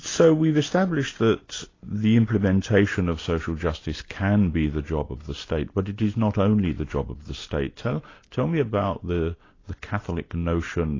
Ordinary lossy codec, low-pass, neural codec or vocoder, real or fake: AAC, 32 kbps; 7.2 kHz; none; real